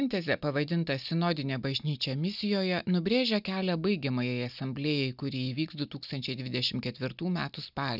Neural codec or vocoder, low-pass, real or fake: none; 5.4 kHz; real